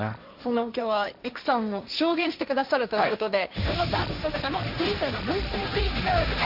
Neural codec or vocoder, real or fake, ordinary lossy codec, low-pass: codec, 16 kHz, 1.1 kbps, Voila-Tokenizer; fake; none; 5.4 kHz